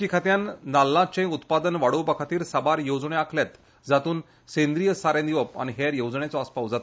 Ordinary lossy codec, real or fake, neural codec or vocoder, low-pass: none; real; none; none